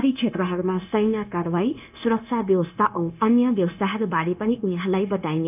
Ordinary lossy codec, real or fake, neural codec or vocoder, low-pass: none; fake; codec, 16 kHz, 0.9 kbps, LongCat-Audio-Codec; 3.6 kHz